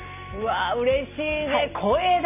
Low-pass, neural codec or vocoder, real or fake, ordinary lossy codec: 3.6 kHz; none; real; none